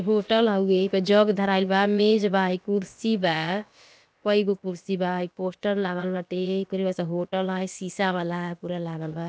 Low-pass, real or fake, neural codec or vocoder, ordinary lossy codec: none; fake; codec, 16 kHz, about 1 kbps, DyCAST, with the encoder's durations; none